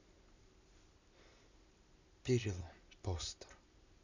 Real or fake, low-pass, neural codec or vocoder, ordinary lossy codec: real; 7.2 kHz; none; none